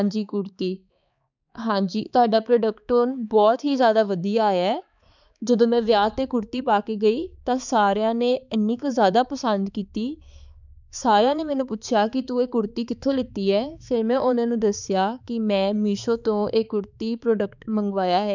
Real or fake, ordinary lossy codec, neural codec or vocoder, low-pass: fake; none; codec, 16 kHz, 4 kbps, X-Codec, HuBERT features, trained on balanced general audio; 7.2 kHz